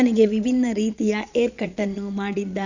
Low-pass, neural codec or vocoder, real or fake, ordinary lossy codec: 7.2 kHz; vocoder, 22.05 kHz, 80 mel bands, Vocos; fake; none